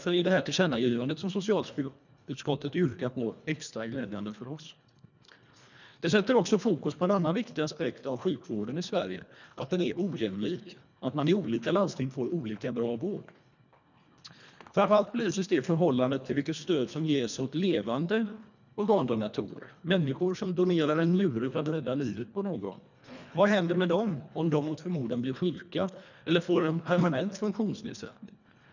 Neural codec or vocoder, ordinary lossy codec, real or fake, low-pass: codec, 24 kHz, 1.5 kbps, HILCodec; none; fake; 7.2 kHz